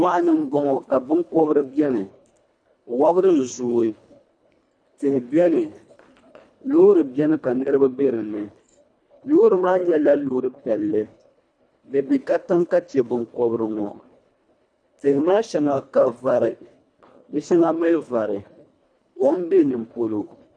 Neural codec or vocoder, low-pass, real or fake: codec, 24 kHz, 1.5 kbps, HILCodec; 9.9 kHz; fake